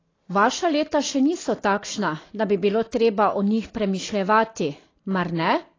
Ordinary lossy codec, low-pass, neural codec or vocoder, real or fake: AAC, 32 kbps; 7.2 kHz; none; real